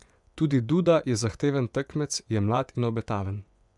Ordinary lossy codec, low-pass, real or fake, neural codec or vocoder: none; 10.8 kHz; fake; vocoder, 24 kHz, 100 mel bands, Vocos